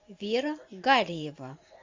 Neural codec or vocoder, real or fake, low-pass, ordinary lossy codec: vocoder, 44.1 kHz, 128 mel bands every 512 samples, BigVGAN v2; fake; 7.2 kHz; MP3, 64 kbps